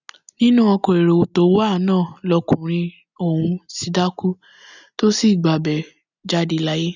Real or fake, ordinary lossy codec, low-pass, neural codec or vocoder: real; none; 7.2 kHz; none